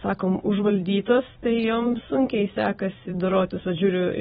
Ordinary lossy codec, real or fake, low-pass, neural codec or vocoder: AAC, 16 kbps; fake; 19.8 kHz; vocoder, 44.1 kHz, 128 mel bands every 256 samples, BigVGAN v2